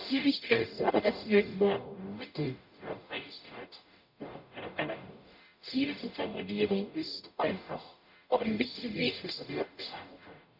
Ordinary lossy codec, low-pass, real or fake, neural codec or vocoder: none; 5.4 kHz; fake; codec, 44.1 kHz, 0.9 kbps, DAC